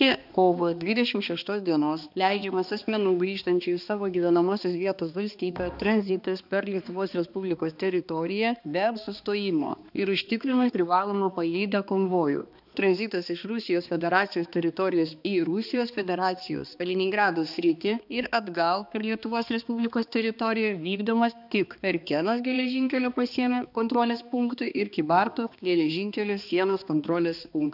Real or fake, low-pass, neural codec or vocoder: fake; 5.4 kHz; codec, 16 kHz, 2 kbps, X-Codec, HuBERT features, trained on balanced general audio